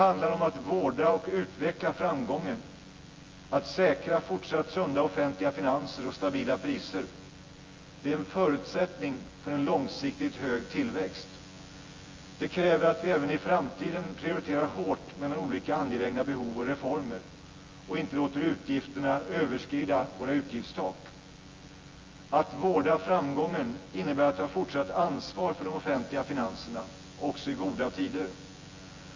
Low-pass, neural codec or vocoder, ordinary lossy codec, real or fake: 7.2 kHz; vocoder, 24 kHz, 100 mel bands, Vocos; Opus, 32 kbps; fake